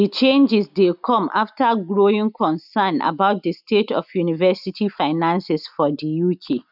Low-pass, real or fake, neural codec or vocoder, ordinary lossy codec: 5.4 kHz; real; none; none